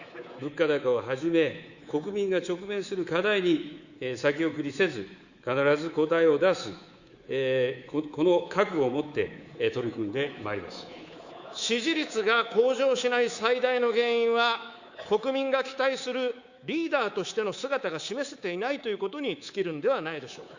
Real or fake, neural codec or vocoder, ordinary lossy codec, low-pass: fake; codec, 24 kHz, 3.1 kbps, DualCodec; Opus, 64 kbps; 7.2 kHz